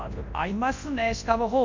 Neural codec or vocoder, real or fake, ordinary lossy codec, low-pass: codec, 24 kHz, 0.9 kbps, WavTokenizer, large speech release; fake; MP3, 48 kbps; 7.2 kHz